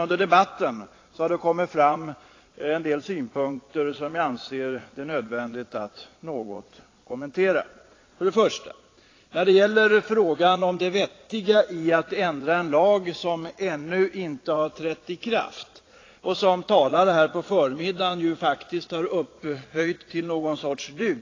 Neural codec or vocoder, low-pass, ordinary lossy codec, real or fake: vocoder, 22.05 kHz, 80 mel bands, Vocos; 7.2 kHz; AAC, 32 kbps; fake